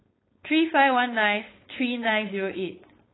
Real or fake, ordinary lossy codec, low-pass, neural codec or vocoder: fake; AAC, 16 kbps; 7.2 kHz; codec, 16 kHz, 4.8 kbps, FACodec